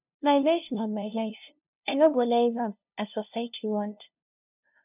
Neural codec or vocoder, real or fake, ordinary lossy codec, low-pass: codec, 16 kHz, 0.5 kbps, FunCodec, trained on LibriTTS, 25 frames a second; fake; none; 3.6 kHz